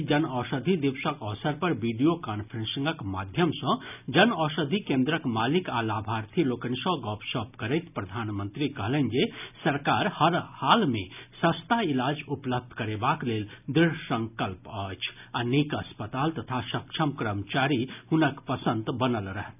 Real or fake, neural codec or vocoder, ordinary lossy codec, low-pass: real; none; none; 3.6 kHz